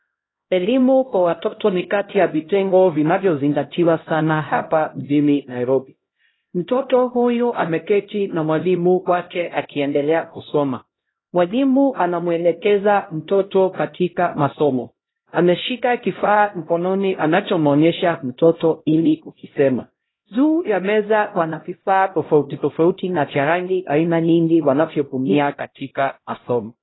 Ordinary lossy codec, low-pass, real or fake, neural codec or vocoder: AAC, 16 kbps; 7.2 kHz; fake; codec, 16 kHz, 0.5 kbps, X-Codec, HuBERT features, trained on LibriSpeech